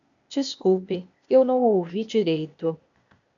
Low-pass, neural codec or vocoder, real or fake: 7.2 kHz; codec, 16 kHz, 0.8 kbps, ZipCodec; fake